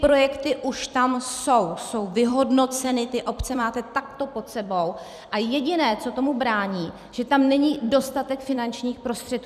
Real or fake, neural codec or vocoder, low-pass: fake; vocoder, 44.1 kHz, 128 mel bands every 256 samples, BigVGAN v2; 14.4 kHz